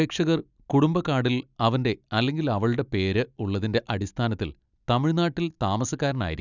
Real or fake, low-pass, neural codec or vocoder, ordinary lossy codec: real; 7.2 kHz; none; none